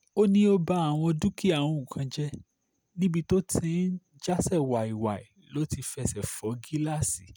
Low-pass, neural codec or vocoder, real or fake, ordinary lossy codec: none; none; real; none